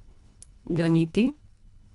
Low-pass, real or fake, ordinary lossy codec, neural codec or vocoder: 10.8 kHz; fake; none; codec, 24 kHz, 1.5 kbps, HILCodec